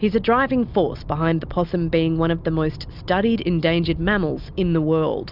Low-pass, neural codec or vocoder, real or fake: 5.4 kHz; none; real